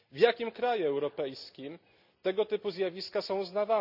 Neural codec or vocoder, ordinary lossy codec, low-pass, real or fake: none; none; 5.4 kHz; real